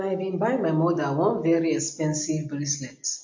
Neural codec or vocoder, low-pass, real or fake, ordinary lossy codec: none; 7.2 kHz; real; MP3, 48 kbps